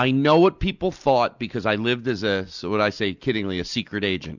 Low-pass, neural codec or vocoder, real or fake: 7.2 kHz; none; real